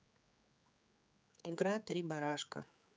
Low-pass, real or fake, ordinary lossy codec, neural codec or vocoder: none; fake; none; codec, 16 kHz, 4 kbps, X-Codec, HuBERT features, trained on general audio